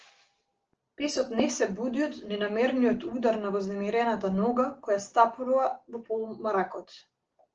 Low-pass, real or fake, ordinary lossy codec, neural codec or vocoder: 7.2 kHz; real; Opus, 16 kbps; none